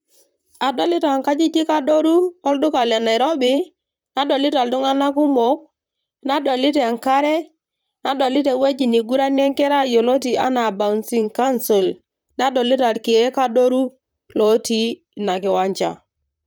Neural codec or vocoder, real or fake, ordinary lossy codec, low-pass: vocoder, 44.1 kHz, 128 mel bands, Pupu-Vocoder; fake; none; none